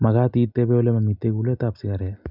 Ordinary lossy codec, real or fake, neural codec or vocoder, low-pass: none; real; none; 5.4 kHz